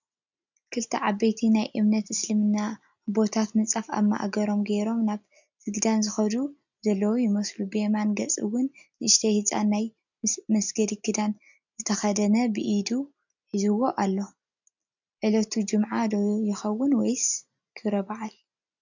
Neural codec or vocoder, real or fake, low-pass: none; real; 7.2 kHz